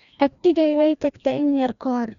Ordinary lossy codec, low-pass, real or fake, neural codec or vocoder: none; 7.2 kHz; fake; codec, 16 kHz, 1 kbps, FreqCodec, larger model